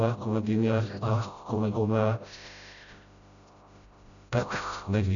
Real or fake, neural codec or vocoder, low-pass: fake; codec, 16 kHz, 0.5 kbps, FreqCodec, smaller model; 7.2 kHz